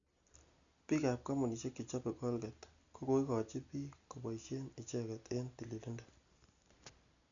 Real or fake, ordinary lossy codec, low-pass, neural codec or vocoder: real; none; 7.2 kHz; none